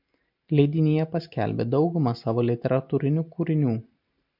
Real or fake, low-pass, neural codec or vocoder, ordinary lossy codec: real; 5.4 kHz; none; MP3, 48 kbps